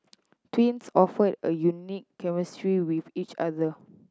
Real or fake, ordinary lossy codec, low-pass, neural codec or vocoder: real; none; none; none